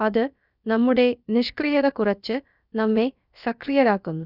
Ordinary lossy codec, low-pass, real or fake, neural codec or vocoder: none; 5.4 kHz; fake; codec, 16 kHz, about 1 kbps, DyCAST, with the encoder's durations